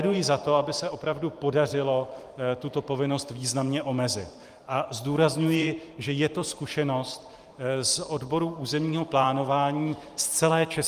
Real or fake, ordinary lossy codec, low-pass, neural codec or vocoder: fake; Opus, 32 kbps; 14.4 kHz; vocoder, 44.1 kHz, 128 mel bands every 512 samples, BigVGAN v2